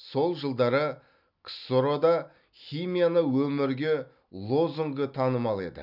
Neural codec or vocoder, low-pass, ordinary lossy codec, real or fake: none; 5.4 kHz; none; real